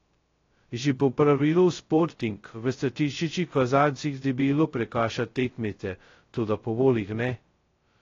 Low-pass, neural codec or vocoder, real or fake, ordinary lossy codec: 7.2 kHz; codec, 16 kHz, 0.2 kbps, FocalCodec; fake; AAC, 32 kbps